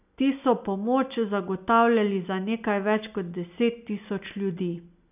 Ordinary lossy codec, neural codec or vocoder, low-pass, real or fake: none; none; 3.6 kHz; real